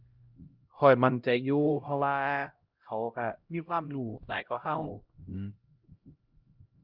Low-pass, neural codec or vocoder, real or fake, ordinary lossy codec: 5.4 kHz; codec, 16 kHz, 0.5 kbps, X-Codec, HuBERT features, trained on LibriSpeech; fake; Opus, 32 kbps